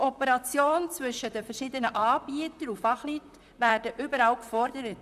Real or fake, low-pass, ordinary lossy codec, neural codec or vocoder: fake; 14.4 kHz; none; vocoder, 44.1 kHz, 128 mel bands, Pupu-Vocoder